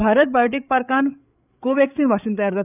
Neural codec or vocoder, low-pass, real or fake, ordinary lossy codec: codec, 16 kHz, 8 kbps, FreqCodec, larger model; 3.6 kHz; fake; none